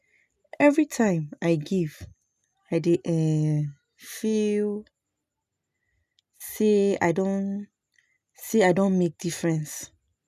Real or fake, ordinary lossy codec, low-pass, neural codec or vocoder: real; none; 14.4 kHz; none